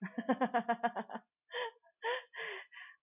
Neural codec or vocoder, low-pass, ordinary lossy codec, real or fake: none; 3.6 kHz; none; real